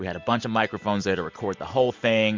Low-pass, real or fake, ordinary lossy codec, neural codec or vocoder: 7.2 kHz; real; MP3, 64 kbps; none